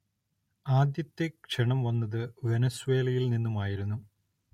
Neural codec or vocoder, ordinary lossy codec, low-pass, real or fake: none; MP3, 64 kbps; 19.8 kHz; real